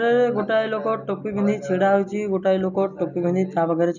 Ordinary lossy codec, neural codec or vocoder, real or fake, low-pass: none; none; real; 7.2 kHz